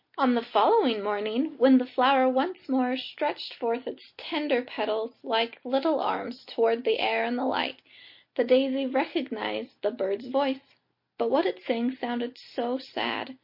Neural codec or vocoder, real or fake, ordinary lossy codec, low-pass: none; real; MP3, 32 kbps; 5.4 kHz